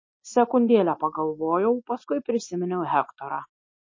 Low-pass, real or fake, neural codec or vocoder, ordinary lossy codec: 7.2 kHz; real; none; MP3, 32 kbps